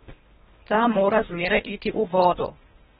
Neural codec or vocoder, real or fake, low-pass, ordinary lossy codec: codec, 24 kHz, 1.5 kbps, HILCodec; fake; 10.8 kHz; AAC, 16 kbps